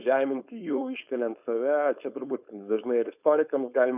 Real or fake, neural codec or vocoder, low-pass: fake; codec, 16 kHz, 4.8 kbps, FACodec; 3.6 kHz